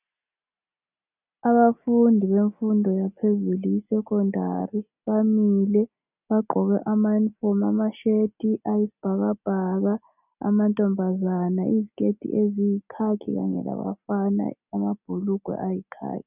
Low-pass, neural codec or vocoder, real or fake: 3.6 kHz; none; real